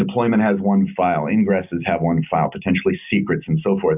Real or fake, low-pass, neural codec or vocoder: real; 3.6 kHz; none